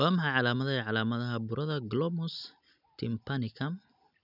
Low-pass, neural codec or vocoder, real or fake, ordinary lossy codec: 5.4 kHz; none; real; none